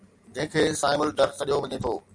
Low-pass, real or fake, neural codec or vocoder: 9.9 kHz; real; none